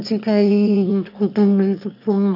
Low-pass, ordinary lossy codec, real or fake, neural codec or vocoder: 5.4 kHz; none; fake; autoencoder, 22.05 kHz, a latent of 192 numbers a frame, VITS, trained on one speaker